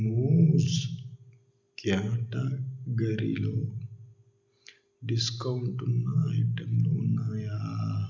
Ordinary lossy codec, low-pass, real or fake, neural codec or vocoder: none; 7.2 kHz; real; none